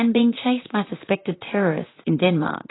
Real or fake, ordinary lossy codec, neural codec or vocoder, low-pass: fake; AAC, 16 kbps; vocoder, 44.1 kHz, 128 mel bands, Pupu-Vocoder; 7.2 kHz